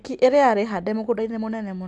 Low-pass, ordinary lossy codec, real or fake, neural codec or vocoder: 10.8 kHz; AAC, 64 kbps; real; none